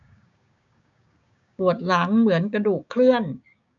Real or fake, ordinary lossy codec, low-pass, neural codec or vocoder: fake; none; 7.2 kHz; codec, 16 kHz, 6 kbps, DAC